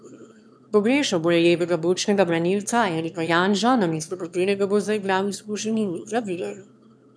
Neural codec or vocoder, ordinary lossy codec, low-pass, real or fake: autoencoder, 22.05 kHz, a latent of 192 numbers a frame, VITS, trained on one speaker; none; none; fake